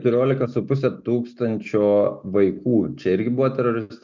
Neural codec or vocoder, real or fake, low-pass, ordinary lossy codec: none; real; 7.2 kHz; MP3, 64 kbps